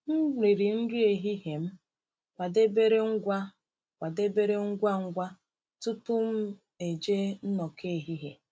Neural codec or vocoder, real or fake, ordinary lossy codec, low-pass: none; real; none; none